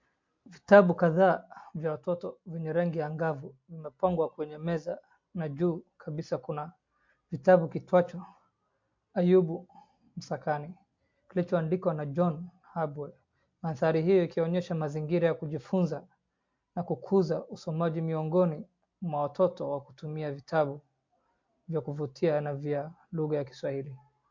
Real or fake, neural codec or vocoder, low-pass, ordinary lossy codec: real; none; 7.2 kHz; MP3, 48 kbps